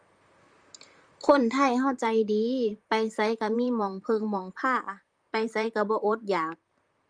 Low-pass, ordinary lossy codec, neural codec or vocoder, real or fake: 9.9 kHz; Opus, 32 kbps; vocoder, 44.1 kHz, 128 mel bands, Pupu-Vocoder; fake